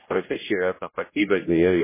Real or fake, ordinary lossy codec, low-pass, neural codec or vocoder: fake; MP3, 16 kbps; 3.6 kHz; codec, 16 kHz, 0.5 kbps, X-Codec, HuBERT features, trained on general audio